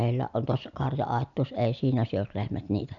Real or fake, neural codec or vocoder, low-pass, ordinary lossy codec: real; none; 7.2 kHz; none